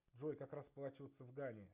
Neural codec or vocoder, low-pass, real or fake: none; 3.6 kHz; real